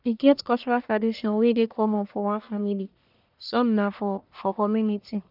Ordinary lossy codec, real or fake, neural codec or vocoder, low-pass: none; fake; codec, 44.1 kHz, 1.7 kbps, Pupu-Codec; 5.4 kHz